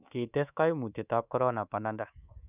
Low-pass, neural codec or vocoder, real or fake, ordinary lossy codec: 3.6 kHz; codec, 24 kHz, 3.1 kbps, DualCodec; fake; none